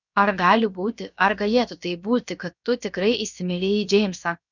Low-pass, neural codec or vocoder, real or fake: 7.2 kHz; codec, 16 kHz, 0.7 kbps, FocalCodec; fake